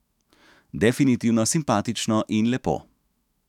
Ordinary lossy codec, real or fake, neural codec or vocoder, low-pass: none; fake; autoencoder, 48 kHz, 128 numbers a frame, DAC-VAE, trained on Japanese speech; 19.8 kHz